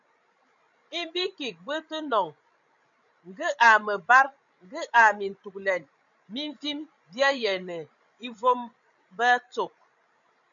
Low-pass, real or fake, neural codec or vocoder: 7.2 kHz; fake; codec, 16 kHz, 16 kbps, FreqCodec, larger model